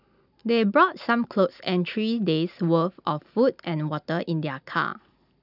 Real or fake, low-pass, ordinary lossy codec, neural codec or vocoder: real; 5.4 kHz; none; none